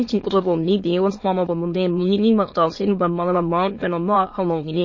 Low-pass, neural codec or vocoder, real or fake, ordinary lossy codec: 7.2 kHz; autoencoder, 22.05 kHz, a latent of 192 numbers a frame, VITS, trained on many speakers; fake; MP3, 32 kbps